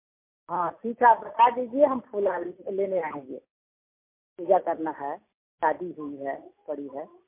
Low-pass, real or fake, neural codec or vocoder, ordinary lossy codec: 3.6 kHz; real; none; MP3, 24 kbps